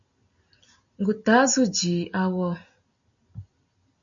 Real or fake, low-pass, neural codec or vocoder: real; 7.2 kHz; none